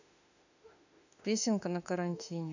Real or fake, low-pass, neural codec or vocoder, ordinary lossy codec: fake; 7.2 kHz; autoencoder, 48 kHz, 32 numbers a frame, DAC-VAE, trained on Japanese speech; none